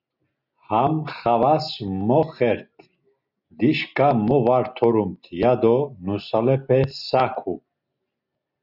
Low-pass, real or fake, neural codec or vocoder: 5.4 kHz; real; none